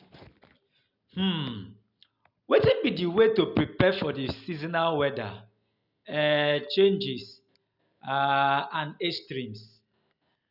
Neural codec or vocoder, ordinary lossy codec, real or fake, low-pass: none; none; real; 5.4 kHz